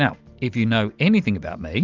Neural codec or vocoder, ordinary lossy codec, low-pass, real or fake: none; Opus, 24 kbps; 7.2 kHz; real